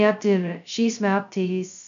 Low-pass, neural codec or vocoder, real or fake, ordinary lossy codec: 7.2 kHz; codec, 16 kHz, 0.2 kbps, FocalCodec; fake; MP3, 96 kbps